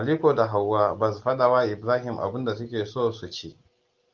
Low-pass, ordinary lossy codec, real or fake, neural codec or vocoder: 7.2 kHz; Opus, 24 kbps; real; none